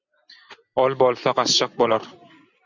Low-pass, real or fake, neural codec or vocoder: 7.2 kHz; real; none